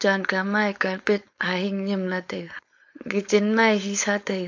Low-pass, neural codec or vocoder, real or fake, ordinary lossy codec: 7.2 kHz; codec, 16 kHz, 4.8 kbps, FACodec; fake; AAC, 48 kbps